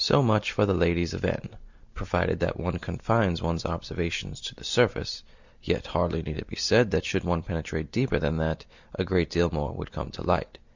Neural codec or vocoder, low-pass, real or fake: none; 7.2 kHz; real